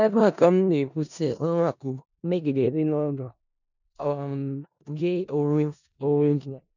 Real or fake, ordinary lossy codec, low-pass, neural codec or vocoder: fake; none; 7.2 kHz; codec, 16 kHz in and 24 kHz out, 0.4 kbps, LongCat-Audio-Codec, four codebook decoder